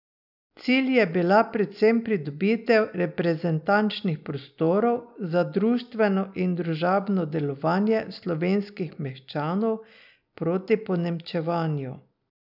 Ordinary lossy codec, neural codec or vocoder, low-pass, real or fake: none; none; 5.4 kHz; real